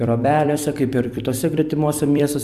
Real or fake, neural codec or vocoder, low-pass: fake; vocoder, 44.1 kHz, 128 mel bands every 256 samples, BigVGAN v2; 14.4 kHz